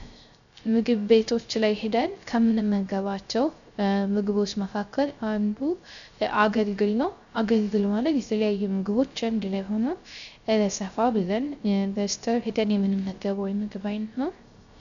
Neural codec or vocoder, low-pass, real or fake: codec, 16 kHz, 0.3 kbps, FocalCodec; 7.2 kHz; fake